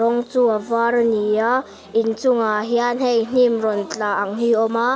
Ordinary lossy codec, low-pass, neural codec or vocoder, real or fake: none; none; none; real